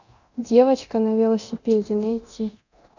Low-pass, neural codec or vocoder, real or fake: 7.2 kHz; codec, 24 kHz, 0.9 kbps, DualCodec; fake